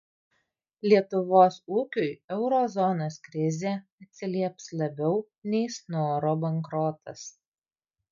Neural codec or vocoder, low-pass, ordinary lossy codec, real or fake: none; 7.2 kHz; MP3, 48 kbps; real